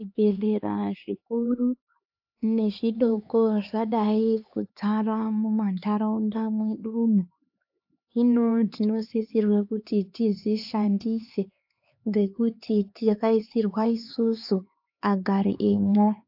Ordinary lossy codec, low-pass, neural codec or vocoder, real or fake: AAC, 48 kbps; 5.4 kHz; codec, 16 kHz, 4 kbps, X-Codec, HuBERT features, trained on LibriSpeech; fake